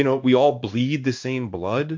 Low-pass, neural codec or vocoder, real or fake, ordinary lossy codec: 7.2 kHz; codec, 16 kHz in and 24 kHz out, 1 kbps, XY-Tokenizer; fake; MP3, 48 kbps